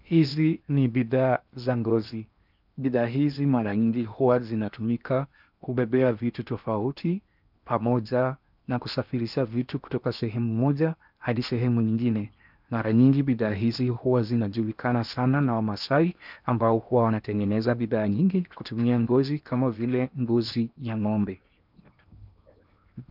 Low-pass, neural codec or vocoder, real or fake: 5.4 kHz; codec, 16 kHz in and 24 kHz out, 0.8 kbps, FocalCodec, streaming, 65536 codes; fake